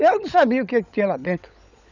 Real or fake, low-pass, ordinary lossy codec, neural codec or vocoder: fake; 7.2 kHz; none; codec, 16 kHz, 16 kbps, FunCodec, trained on LibriTTS, 50 frames a second